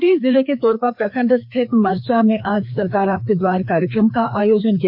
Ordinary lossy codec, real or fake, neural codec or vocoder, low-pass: MP3, 48 kbps; fake; codec, 16 kHz, 2 kbps, FreqCodec, larger model; 5.4 kHz